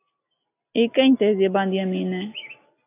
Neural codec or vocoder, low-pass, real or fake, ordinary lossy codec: none; 3.6 kHz; real; AAC, 24 kbps